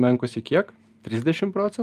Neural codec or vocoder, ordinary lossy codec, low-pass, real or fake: none; Opus, 32 kbps; 14.4 kHz; real